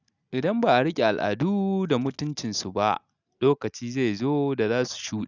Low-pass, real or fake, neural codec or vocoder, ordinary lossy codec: 7.2 kHz; real; none; none